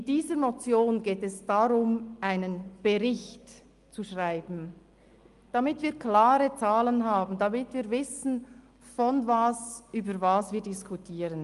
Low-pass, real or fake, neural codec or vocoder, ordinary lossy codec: 10.8 kHz; real; none; Opus, 32 kbps